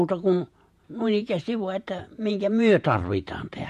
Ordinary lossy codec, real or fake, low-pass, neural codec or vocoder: MP3, 64 kbps; fake; 14.4 kHz; vocoder, 48 kHz, 128 mel bands, Vocos